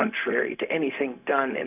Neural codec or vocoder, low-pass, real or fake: codec, 16 kHz, 0.4 kbps, LongCat-Audio-Codec; 3.6 kHz; fake